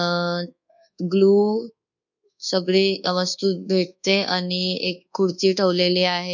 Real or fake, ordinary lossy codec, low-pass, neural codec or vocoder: fake; none; 7.2 kHz; codec, 24 kHz, 1.2 kbps, DualCodec